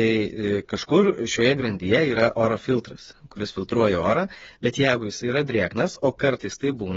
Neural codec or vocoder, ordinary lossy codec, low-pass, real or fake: codec, 16 kHz, 4 kbps, FreqCodec, smaller model; AAC, 24 kbps; 7.2 kHz; fake